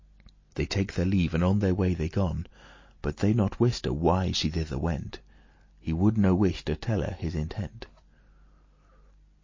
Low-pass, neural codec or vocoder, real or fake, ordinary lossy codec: 7.2 kHz; none; real; MP3, 32 kbps